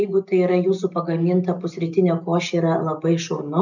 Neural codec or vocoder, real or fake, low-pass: none; real; 7.2 kHz